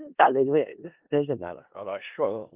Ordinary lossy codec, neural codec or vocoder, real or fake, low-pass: Opus, 24 kbps; codec, 16 kHz in and 24 kHz out, 0.4 kbps, LongCat-Audio-Codec, four codebook decoder; fake; 3.6 kHz